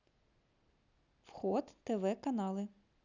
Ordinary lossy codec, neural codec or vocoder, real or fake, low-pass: none; none; real; 7.2 kHz